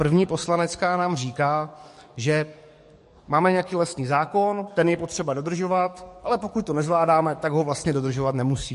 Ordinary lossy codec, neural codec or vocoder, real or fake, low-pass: MP3, 48 kbps; codec, 44.1 kHz, 7.8 kbps, DAC; fake; 14.4 kHz